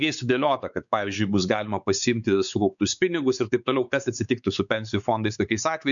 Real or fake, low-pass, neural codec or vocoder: fake; 7.2 kHz; codec, 16 kHz, 4 kbps, X-Codec, WavLM features, trained on Multilingual LibriSpeech